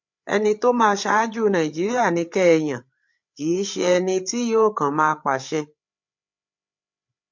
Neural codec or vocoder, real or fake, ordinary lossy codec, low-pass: codec, 16 kHz, 8 kbps, FreqCodec, larger model; fake; MP3, 48 kbps; 7.2 kHz